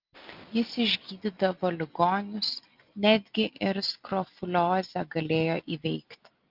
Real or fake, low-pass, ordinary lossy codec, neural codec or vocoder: real; 5.4 kHz; Opus, 24 kbps; none